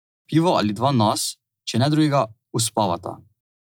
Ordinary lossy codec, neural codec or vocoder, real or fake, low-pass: none; none; real; none